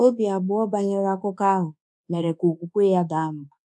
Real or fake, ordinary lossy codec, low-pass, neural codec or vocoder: fake; none; none; codec, 24 kHz, 1.2 kbps, DualCodec